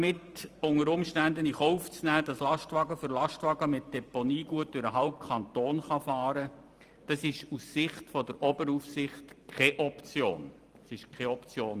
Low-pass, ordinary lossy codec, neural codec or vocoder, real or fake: 14.4 kHz; Opus, 32 kbps; vocoder, 44.1 kHz, 128 mel bands every 512 samples, BigVGAN v2; fake